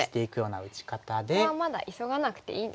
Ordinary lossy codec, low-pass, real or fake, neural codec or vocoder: none; none; real; none